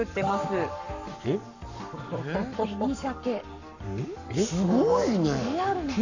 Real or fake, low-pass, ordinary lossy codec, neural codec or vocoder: fake; 7.2 kHz; none; codec, 44.1 kHz, 7.8 kbps, Pupu-Codec